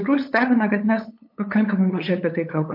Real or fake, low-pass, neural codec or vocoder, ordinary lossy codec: fake; 5.4 kHz; codec, 16 kHz, 8 kbps, FunCodec, trained on LibriTTS, 25 frames a second; MP3, 32 kbps